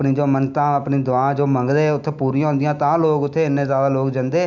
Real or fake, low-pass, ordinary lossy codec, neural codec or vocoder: real; 7.2 kHz; none; none